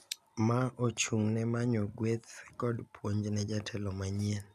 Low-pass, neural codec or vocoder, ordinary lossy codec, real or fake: 14.4 kHz; none; none; real